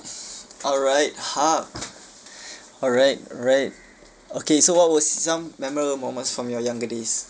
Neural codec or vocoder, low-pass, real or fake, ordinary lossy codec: none; none; real; none